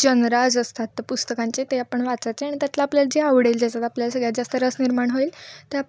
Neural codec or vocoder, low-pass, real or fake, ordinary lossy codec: none; none; real; none